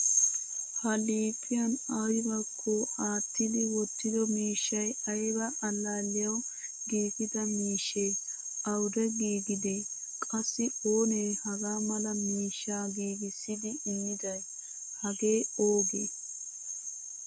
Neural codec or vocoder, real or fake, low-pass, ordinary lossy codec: none; real; 9.9 kHz; MP3, 64 kbps